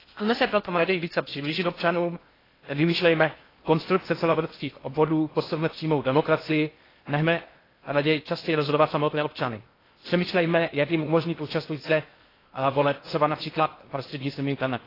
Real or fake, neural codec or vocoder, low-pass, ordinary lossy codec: fake; codec, 16 kHz in and 24 kHz out, 0.6 kbps, FocalCodec, streaming, 2048 codes; 5.4 kHz; AAC, 24 kbps